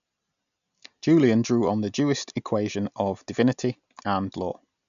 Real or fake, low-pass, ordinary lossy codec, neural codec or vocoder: real; 7.2 kHz; none; none